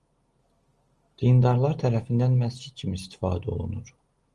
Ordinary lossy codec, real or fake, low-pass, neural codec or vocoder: Opus, 24 kbps; real; 10.8 kHz; none